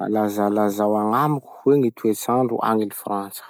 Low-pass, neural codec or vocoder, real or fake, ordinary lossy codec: none; none; real; none